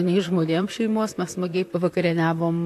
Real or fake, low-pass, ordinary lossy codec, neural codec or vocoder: fake; 14.4 kHz; AAC, 64 kbps; vocoder, 44.1 kHz, 128 mel bands, Pupu-Vocoder